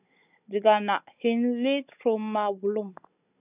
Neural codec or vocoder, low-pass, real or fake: codec, 16 kHz, 16 kbps, FunCodec, trained on Chinese and English, 50 frames a second; 3.6 kHz; fake